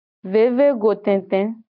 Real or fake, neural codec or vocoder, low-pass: real; none; 5.4 kHz